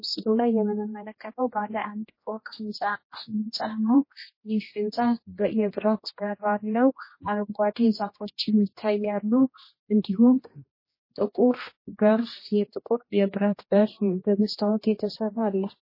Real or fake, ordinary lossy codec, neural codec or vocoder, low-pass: fake; MP3, 24 kbps; codec, 16 kHz, 1 kbps, X-Codec, HuBERT features, trained on general audio; 5.4 kHz